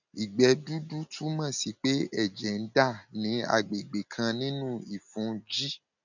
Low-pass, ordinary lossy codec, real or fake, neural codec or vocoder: 7.2 kHz; none; real; none